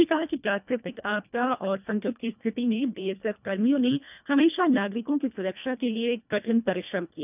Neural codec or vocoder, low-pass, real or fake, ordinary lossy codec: codec, 24 kHz, 1.5 kbps, HILCodec; 3.6 kHz; fake; none